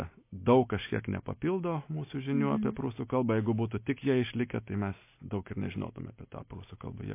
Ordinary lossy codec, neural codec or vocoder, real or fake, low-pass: MP3, 24 kbps; none; real; 3.6 kHz